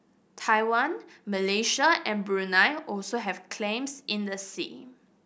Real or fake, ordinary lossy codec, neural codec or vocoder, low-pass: real; none; none; none